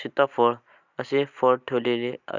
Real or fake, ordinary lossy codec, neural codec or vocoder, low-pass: real; none; none; 7.2 kHz